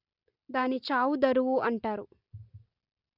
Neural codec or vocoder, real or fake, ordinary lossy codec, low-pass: none; real; Opus, 64 kbps; 5.4 kHz